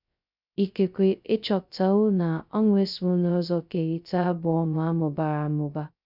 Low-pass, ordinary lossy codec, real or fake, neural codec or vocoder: 5.4 kHz; none; fake; codec, 16 kHz, 0.2 kbps, FocalCodec